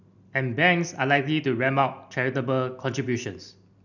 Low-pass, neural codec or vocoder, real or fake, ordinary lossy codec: 7.2 kHz; none; real; none